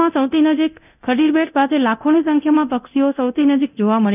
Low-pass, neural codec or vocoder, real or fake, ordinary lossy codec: 3.6 kHz; codec, 24 kHz, 0.9 kbps, DualCodec; fake; none